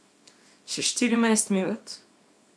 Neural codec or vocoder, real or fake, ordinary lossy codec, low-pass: codec, 24 kHz, 0.9 kbps, WavTokenizer, small release; fake; none; none